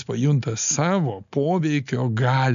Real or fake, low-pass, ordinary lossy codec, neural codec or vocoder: real; 7.2 kHz; MP3, 64 kbps; none